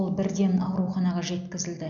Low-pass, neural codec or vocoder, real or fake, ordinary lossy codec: 7.2 kHz; none; real; none